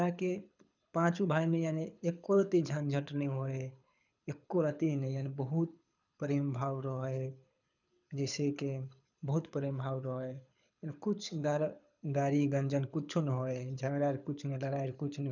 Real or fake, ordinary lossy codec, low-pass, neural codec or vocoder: fake; none; 7.2 kHz; codec, 24 kHz, 6 kbps, HILCodec